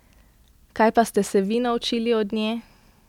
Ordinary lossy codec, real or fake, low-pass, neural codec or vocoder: none; real; 19.8 kHz; none